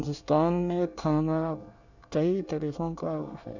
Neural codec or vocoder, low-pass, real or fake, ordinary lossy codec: codec, 24 kHz, 1 kbps, SNAC; 7.2 kHz; fake; none